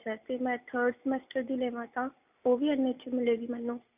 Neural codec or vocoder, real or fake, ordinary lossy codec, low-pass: none; real; none; 3.6 kHz